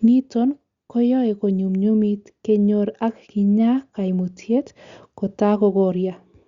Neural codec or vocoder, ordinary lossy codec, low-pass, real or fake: none; Opus, 64 kbps; 7.2 kHz; real